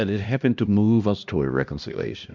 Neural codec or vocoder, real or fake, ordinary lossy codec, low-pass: codec, 16 kHz, 1 kbps, X-Codec, HuBERT features, trained on LibriSpeech; fake; MP3, 64 kbps; 7.2 kHz